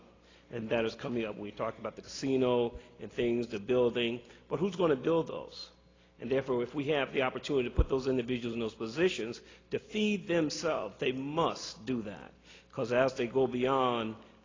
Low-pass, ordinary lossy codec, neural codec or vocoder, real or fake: 7.2 kHz; AAC, 32 kbps; none; real